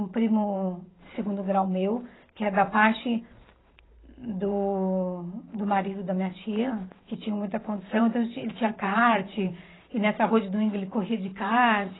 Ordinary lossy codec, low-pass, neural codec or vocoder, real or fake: AAC, 16 kbps; 7.2 kHz; vocoder, 44.1 kHz, 128 mel bands every 512 samples, BigVGAN v2; fake